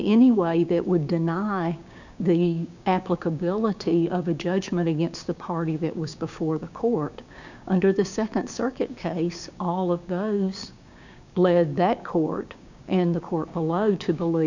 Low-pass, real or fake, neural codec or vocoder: 7.2 kHz; fake; codec, 16 kHz, 6 kbps, DAC